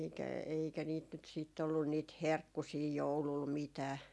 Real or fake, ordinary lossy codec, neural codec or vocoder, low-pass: real; none; none; none